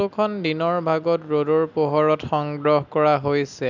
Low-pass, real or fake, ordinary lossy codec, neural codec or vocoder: 7.2 kHz; real; none; none